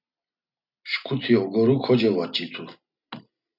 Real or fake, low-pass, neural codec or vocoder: real; 5.4 kHz; none